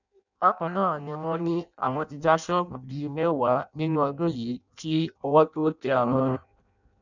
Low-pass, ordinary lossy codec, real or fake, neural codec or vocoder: 7.2 kHz; none; fake; codec, 16 kHz in and 24 kHz out, 0.6 kbps, FireRedTTS-2 codec